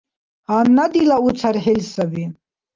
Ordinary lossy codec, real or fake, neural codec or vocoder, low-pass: Opus, 32 kbps; real; none; 7.2 kHz